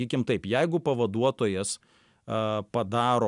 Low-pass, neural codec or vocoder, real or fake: 10.8 kHz; none; real